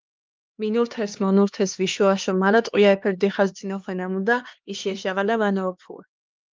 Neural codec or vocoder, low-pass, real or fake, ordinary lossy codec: codec, 16 kHz, 2 kbps, X-Codec, HuBERT features, trained on LibriSpeech; 7.2 kHz; fake; Opus, 24 kbps